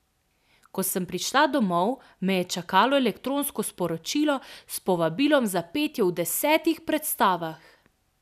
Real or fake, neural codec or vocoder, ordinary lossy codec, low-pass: real; none; none; 14.4 kHz